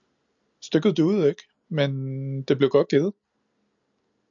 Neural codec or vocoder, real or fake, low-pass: none; real; 7.2 kHz